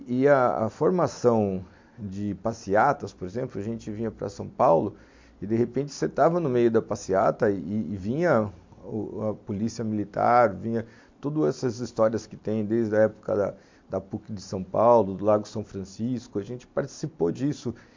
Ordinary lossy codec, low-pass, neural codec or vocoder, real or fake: MP3, 48 kbps; 7.2 kHz; none; real